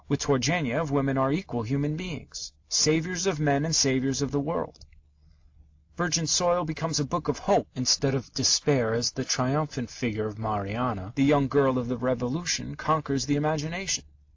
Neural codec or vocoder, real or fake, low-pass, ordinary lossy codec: none; real; 7.2 kHz; AAC, 48 kbps